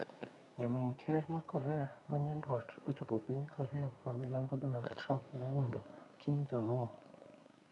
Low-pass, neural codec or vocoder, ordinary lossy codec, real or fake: 10.8 kHz; codec, 24 kHz, 1 kbps, SNAC; none; fake